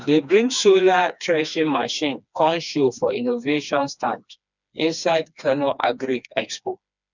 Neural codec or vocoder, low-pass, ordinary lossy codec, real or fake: codec, 16 kHz, 2 kbps, FreqCodec, smaller model; 7.2 kHz; none; fake